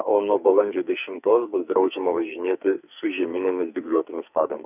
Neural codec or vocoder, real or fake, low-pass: codec, 44.1 kHz, 2.6 kbps, SNAC; fake; 3.6 kHz